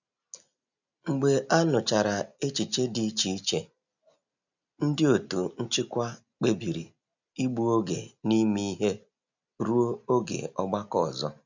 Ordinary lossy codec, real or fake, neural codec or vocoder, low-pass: none; real; none; 7.2 kHz